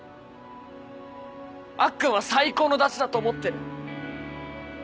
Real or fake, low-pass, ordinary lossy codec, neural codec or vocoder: real; none; none; none